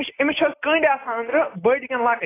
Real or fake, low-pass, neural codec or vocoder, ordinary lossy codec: real; 3.6 kHz; none; AAC, 16 kbps